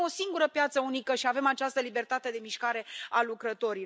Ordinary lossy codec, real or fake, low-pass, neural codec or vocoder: none; real; none; none